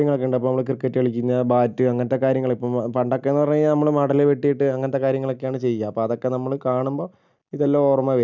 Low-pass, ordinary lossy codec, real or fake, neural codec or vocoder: 7.2 kHz; none; real; none